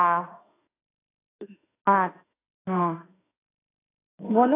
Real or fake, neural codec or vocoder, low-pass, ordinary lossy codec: fake; autoencoder, 48 kHz, 32 numbers a frame, DAC-VAE, trained on Japanese speech; 3.6 kHz; AAC, 16 kbps